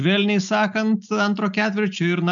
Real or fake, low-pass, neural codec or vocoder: real; 7.2 kHz; none